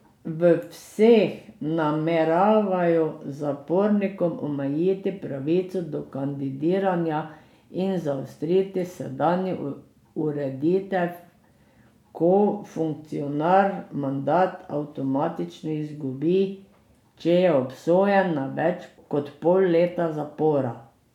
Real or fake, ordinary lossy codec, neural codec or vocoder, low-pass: real; none; none; 19.8 kHz